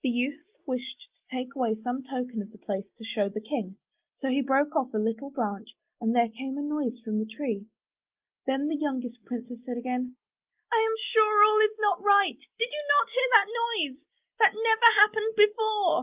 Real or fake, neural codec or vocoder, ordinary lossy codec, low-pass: real; none; Opus, 32 kbps; 3.6 kHz